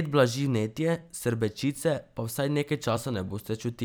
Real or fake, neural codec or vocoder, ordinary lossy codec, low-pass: fake; vocoder, 44.1 kHz, 128 mel bands every 256 samples, BigVGAN v2; none; none